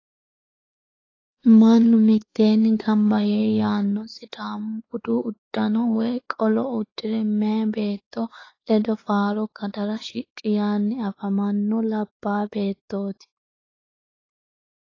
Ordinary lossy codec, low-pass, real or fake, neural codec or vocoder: AAC, 32 kbps; 7.2 kHz; fake; codec, 16 kHz, 4 kbps, X-Codec, WavLM features, trained on Multilingual LibriSpeech